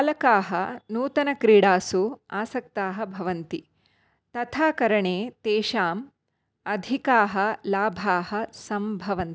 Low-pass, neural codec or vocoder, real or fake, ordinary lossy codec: none; none; real; none